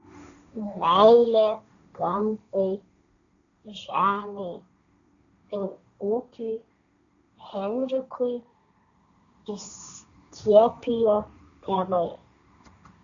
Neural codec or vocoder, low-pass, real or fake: codec, 16 kHz, 1.1 kbps, Voila-Tokenizer; 7.2 kHz; fake